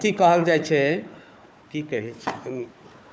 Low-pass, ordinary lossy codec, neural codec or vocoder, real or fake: none; none; codec, 16 kHz, 4 kbps, FunCodec, trained on Chinese and English, 50 frames a second; fake